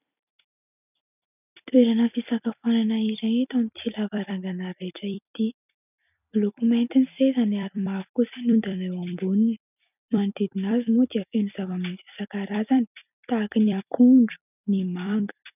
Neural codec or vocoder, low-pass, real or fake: vocoder, 44.1 kHz, 128 mel bands every 256 samples, BigVGAN v2; 3.6 kHz; fake